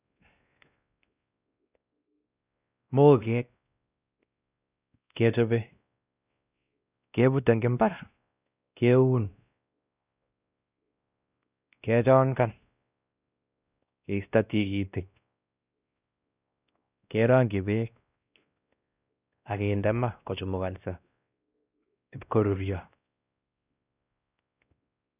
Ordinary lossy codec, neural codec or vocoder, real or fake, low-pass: none; codec, 16 kHz, 1 kbps, X-Codec, WavLM features, trained on Multilingual LibriSpeech; fake; 3.6 kHz